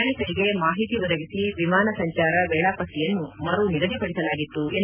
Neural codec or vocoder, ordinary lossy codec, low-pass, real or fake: none; none; 3.6 kHz; real